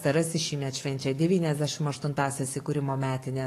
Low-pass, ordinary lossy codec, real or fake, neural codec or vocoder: 14.4 kHz; AAC, 48 kbps; fake; codec, 44.1 kHz, 7.8 kbps, DAC